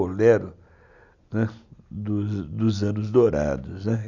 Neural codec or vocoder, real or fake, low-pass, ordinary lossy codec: none; real; 7.2 kHz; none